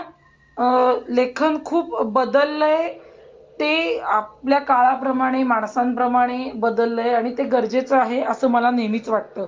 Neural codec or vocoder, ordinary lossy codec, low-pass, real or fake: none; Opus, 32 kbps; 7.2 kHz; real